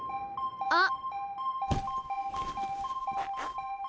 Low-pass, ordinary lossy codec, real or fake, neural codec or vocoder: none; none; real; none